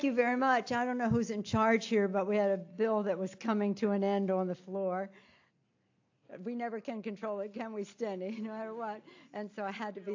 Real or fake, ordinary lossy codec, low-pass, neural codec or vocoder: real; AAC, 48 kbps; 7.2 kHz; none